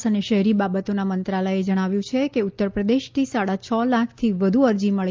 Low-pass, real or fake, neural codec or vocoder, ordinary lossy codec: 7.2 kHz; real; none; Opus, 32 kbps